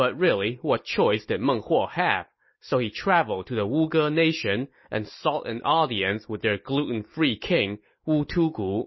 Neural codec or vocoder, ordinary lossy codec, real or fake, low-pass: none; MP3, 24 kbps; real; 7.2 kHz